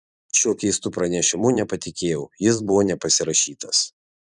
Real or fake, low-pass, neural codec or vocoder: fake; 10.8 kHz; vocoder, 44.1 kHz, 128 mel bands every 256 samples, BigVGAN v2